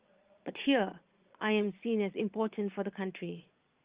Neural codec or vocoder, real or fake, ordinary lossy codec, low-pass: vocoder, 22.05 kHz, 80 mel bands, Vocos; fake; Opus, 32 kbps; 3.6 kHz